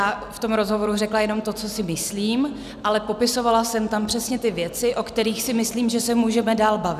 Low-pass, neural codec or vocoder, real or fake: 14.4 kHz; none; real